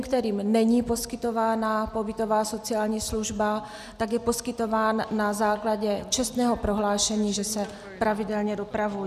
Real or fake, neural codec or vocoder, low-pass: real; none; 14.4 kHz